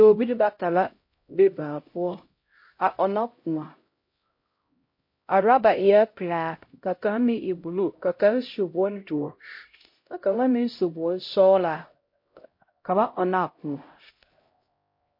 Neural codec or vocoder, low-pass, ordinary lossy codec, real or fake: codec, 16 kHz, 0.5 kbps, X-Codec, HuBERT features, trained on LibriSpeech; 5.4 kHz; MP3, 32 kbps; fake